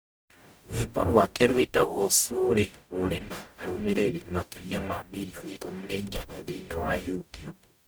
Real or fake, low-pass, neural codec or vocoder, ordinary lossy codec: fake; none; codec, 44.1 kHz, 0.9 kbps, DAC; none